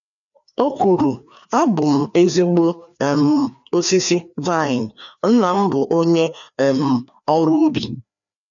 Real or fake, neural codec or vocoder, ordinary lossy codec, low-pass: fake; codec, 16 kHz, 2 kbps, FreqCodec, larger model; none; 7.2 kHz